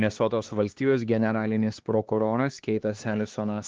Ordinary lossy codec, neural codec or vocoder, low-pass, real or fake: Opus, 16 kbps; codec, 16 kHz, 2 kbps, X-Codec, HuBERT features, trained on LibriSpeech; 7.2 kHz; fake